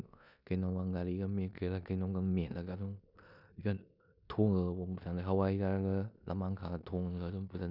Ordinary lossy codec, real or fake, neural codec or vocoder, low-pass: none; fake; codec, 16 kHz in and 24 kHz out, 0.9 kbps, LongCat-Audio-Codec, four codebook decoder; 5.4 kHz